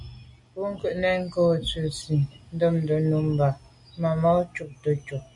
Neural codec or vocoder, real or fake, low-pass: none; real; 10.8 kHz